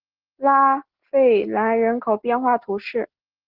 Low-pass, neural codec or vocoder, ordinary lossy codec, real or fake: 5.4 kHz; none; Opus, 16 kbps; real